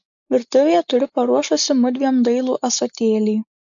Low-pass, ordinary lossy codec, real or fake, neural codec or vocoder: 7.2 kHz; AAC, 64 kbps; real; none